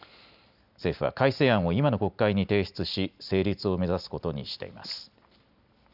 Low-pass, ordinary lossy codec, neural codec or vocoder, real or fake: 5.4 kHz; none; none; real